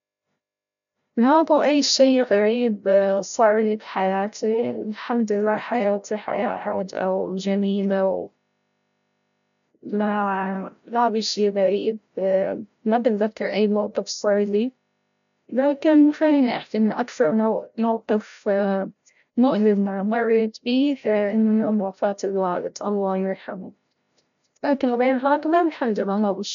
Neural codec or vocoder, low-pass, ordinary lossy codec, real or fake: codec, 16 kHz, 0.5 kbps, FreqCodec, larger model; 7.2 kHz; none; fake